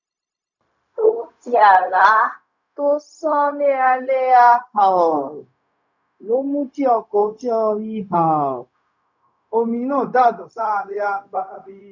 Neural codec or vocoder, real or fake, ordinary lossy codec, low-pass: codec, 16 kHz, 0.4 kbps, LongCat-Audio-Codec; fake; none; 7.2 kHz